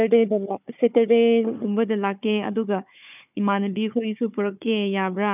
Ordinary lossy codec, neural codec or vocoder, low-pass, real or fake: none; codec, 16 kHz, 4 kbps, FunCodec, trained on Chinese and English, 50 frames a second; 3.6 kHz; fake